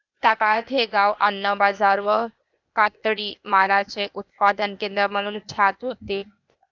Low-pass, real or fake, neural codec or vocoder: 7.2 kHz; fake; codec, 16 kHz, 0.8 kbps, ZipCodec